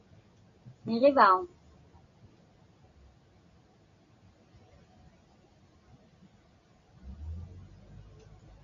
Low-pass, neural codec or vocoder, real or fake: 7.2 kHz; none; real